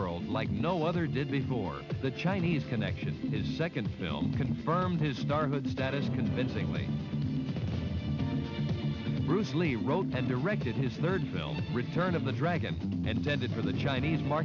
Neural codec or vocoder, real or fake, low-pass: none; real; 7.2 kHz